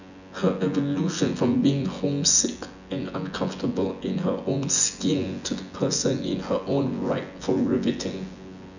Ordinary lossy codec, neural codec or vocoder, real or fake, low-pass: none; vocoder, 24 kHz, 100 mel bands, Vocos; fake; 7.2 kHz